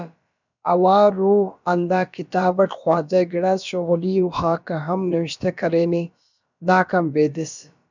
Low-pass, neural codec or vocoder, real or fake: 7.2 kHz; codec, 16 kHz, about 1 kbps, DyCAST, with the encoder's durations; fake